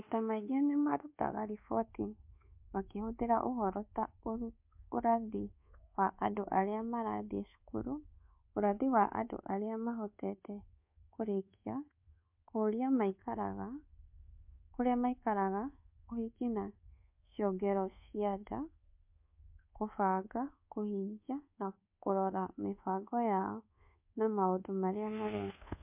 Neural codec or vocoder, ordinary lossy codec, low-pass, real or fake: codec, 24 kHz, 1.2 kbps, DualCodec; MP3, 32 kbps; 3.6 kHz; fake